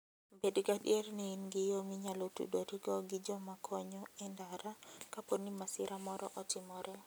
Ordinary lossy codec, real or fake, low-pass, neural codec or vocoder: none; real; none; none